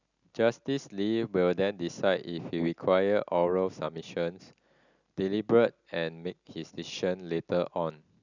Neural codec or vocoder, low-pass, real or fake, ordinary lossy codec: none; 7.2 kHz; real; none